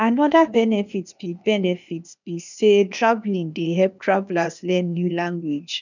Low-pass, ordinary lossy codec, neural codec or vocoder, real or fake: 7.2 kHz; none; codec, 16 kHz, 0.8 kbps, ZipCodec; fake